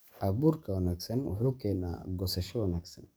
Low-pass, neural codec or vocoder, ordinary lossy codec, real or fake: none; codec, 44.1 kHz, 7.8 kbps, DAC; none; fake